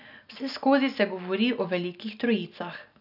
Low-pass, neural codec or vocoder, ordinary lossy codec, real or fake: 5.4 kHz; none; none; real